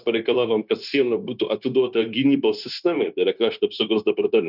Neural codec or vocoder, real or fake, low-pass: codec, 16 kHz, 0.9 kbps, LongCat-Audio-Codec; fake; 5.4 kHz